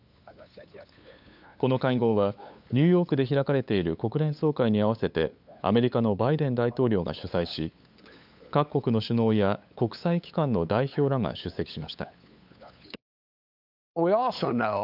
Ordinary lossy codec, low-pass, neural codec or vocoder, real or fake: none; 5.4 kHz; codec, 16 kHz, 8 kbps, FunCodec, trained on LibriTTS, 25 frames a second; fake